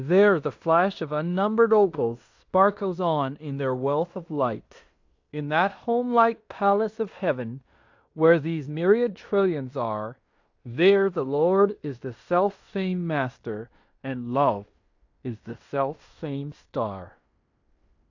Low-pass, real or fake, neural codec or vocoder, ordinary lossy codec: 7.2 kHz; fake; codec, 16 kHz in and 24 kHz out, 0.9 kbps, LongCat-Audio-Codec, fine tuned four codebook decoder; AAC, 48 kbps